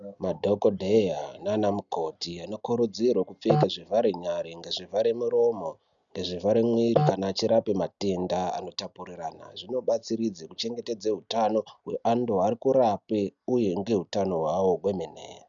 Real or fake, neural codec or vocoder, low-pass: real; none; 7.2 kHz